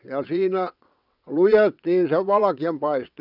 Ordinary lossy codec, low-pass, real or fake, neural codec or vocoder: none; 5.4 kHz; fake; vocoder, 22.05 kHz, 80 mel bands, WaveNeXt